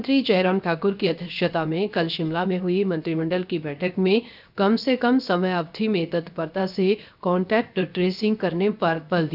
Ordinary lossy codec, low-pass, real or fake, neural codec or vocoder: none; 5.4 kHz; fake; codec, 16 kHz, 0.7 kbps, FocalCodec